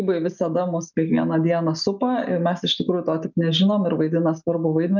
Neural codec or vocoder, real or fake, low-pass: none; real; 7.2 kHz